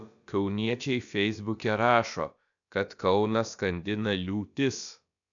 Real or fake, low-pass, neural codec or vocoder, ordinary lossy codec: fake; 7.2 kHz; codec, 16 kHz, about 1 kbps, DyCAST, with the encoder's durations; MP3, 96 kbps